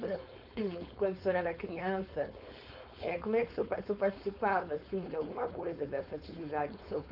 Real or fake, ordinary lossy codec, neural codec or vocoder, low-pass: fake; none; codec, 16 kHz, 4.8 kbps, FACodec; 5.4 kHz